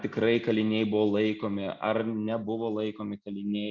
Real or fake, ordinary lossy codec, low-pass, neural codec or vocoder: real; Opus, 64 kbps; 7.2 kHz; none